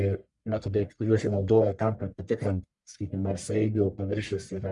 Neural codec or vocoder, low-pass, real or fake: codec, 44.1 kHz, 1.7 kbps, Pupu-Codec; 10.8 kHz; fake